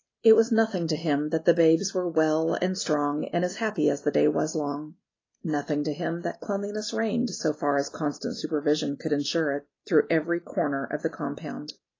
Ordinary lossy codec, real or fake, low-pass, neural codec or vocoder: AAC, 32 kbps; real; 7.2 kHz; none